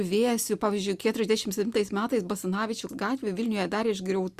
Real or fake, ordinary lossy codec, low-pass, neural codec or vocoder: real; Opus, 64 kbps; 14.4 kHz; none